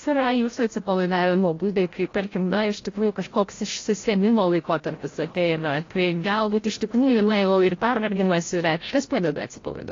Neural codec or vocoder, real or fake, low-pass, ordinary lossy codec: codec, 16 kHz, 0.5 kbps, FreqCodec, larger model; fake; 7.2 kHz; AAC, 32 kbps